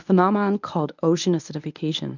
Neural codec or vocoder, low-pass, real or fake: codec, 24 kHz, 0.9 kbps, WavTokenizer, medium speech release version 1; 7.2 kHz; fake